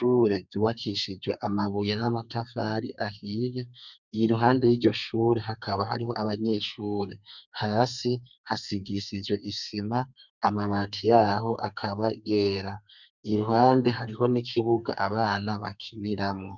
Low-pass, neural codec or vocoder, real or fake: 7.2 kHz; codec, 32 kHz, 1.9 kbps, SNAC; fake